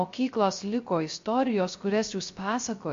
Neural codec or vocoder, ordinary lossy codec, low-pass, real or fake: codec, 16 kHz, 0.3 kbps, FocalCodec; MP3, 48 kbps; 7.2 kHz; fake